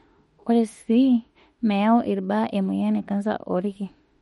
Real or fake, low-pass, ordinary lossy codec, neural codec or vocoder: fake; 19.8 kHz; MP3, 48 kbps; autoencoder, 48 kHz, 32 numbers a frame, DAC-VAE, trained on Japanese speech